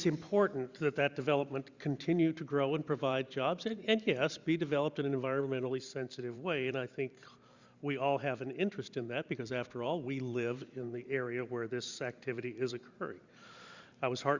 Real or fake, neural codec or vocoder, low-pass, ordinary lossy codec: fake; vocoder, 44.1 kHz, 128 mel bands every 512 samples, BigVGAN v2; 7.2 kHz; Opus, 64 kbps